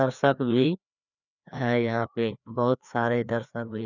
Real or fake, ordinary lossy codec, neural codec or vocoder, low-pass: fake; none; codec, 16 kHz, 2 kbps, FreqCodec, larger model; 7.2 kHz